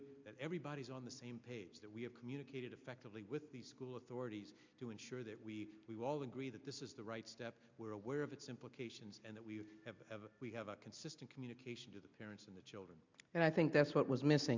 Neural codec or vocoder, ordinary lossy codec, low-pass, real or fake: none; MP3, 64 kbps; 7.2 kHz; real